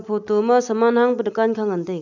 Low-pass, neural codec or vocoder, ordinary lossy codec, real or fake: 7.2 kHz; none; none; real